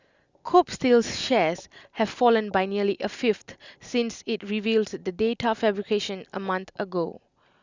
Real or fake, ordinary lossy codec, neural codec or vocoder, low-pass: real; Opus, 64 kbps; none; 7.2 kHz